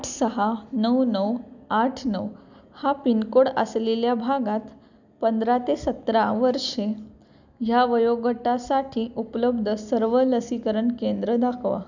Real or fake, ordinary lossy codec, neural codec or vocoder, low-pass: real; none; none; 7.2 kHz